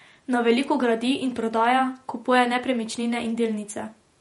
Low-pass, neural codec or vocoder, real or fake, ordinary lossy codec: 19.8 kHz; vocoder, 48 kHz, 128 mel bands, Vocos; fake; MP3, 48 kbps